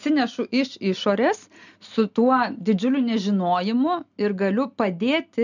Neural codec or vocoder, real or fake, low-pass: none; real; 7.2 kHz